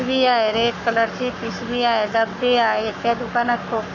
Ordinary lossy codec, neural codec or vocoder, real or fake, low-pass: none; codec, 44.1 kHz, 7.8 kbps, Pupu-Codec; fake; 7.2 kHz